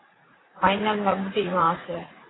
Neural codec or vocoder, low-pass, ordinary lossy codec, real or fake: vocoder, 44.1 kHz, 80 mel bands, Vocos; 7.2 kHz; AAC, 16 kbps; fake